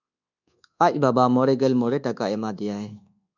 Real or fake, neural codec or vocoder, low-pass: fake; codec, 24 kHz, 1.2 kbps, DualCodec; 7.2 kHz